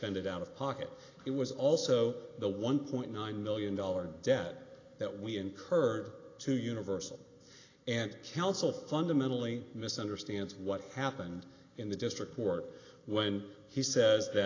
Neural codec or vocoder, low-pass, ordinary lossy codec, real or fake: none; 7.2 kHz; AAC, 32 kbps; real